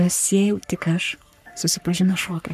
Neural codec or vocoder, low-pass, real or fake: codec, 44.1 kHz, 3.4 kbps, Pupu-Codec; 14.4 kHz; fake